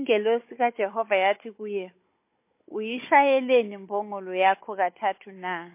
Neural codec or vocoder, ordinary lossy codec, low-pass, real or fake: codec, 16 kHz, 16 kbps, FunCodec, trained on Chinese and English, 50 frames a second; MP3, 24 kbps; 3.6 kHz; fake